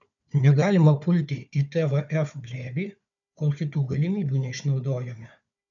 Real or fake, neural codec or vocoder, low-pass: fake; codec, 16 kHz, 4 kbps, FunCodec, trained on Chinese and English, 50 frames a second; 7.2 kHz